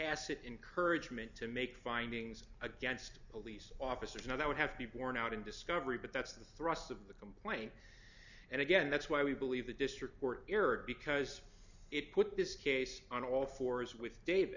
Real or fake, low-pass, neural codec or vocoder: real; 7.2 kHz; none